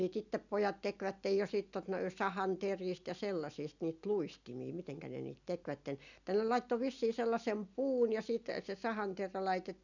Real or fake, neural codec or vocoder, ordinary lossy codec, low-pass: real; none; none; 7.2 kHz